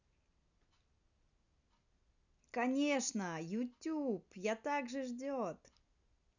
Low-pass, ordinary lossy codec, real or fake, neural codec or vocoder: 7.2 kHz; none; real; none